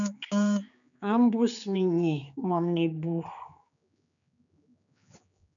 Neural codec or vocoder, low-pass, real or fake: codec, 16 kHz, 4 kbps, X-Codec, HuBERT features, trained on general audio; 7.2 kHz; fake